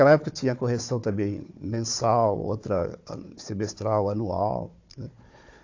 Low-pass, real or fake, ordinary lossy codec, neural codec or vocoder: 7.2 kHz; fake; AAC, 48 kbps; codec, 16 kHz, 4 kbps, FunCodec, trained on Chinese and English, 50 frames a second